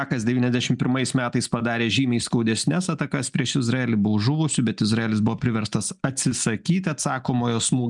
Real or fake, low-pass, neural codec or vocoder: real; 10.8 kHz; none